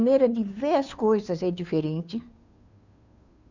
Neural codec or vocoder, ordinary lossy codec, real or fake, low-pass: codec, 16 kHz, 2 kbps, FunCodec, trained on Chinese and English, 25 frames a second; none; fake; 7.2 kHz